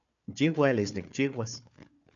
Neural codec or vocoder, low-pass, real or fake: codec, 16 kHz, 4 kbps, FunCodec, trained on Chinese and English, 50 frames a second; 7.2 kHz; fake